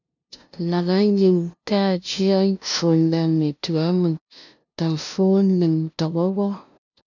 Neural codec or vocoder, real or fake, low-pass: codec, 16 kHz, 0.5 kbps, FunCodec, trained on LibriTTS, 25 frames a second; fake; 7.2 kHz